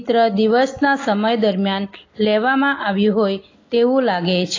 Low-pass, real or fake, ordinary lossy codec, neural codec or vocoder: 7.2 kHz; real; AAC, 32 kbps; none